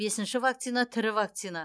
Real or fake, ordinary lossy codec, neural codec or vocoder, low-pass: real; none; none; none